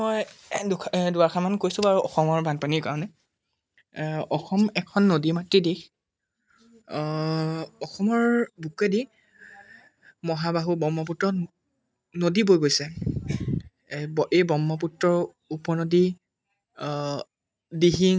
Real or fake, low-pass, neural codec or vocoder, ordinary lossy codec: real; none; none; none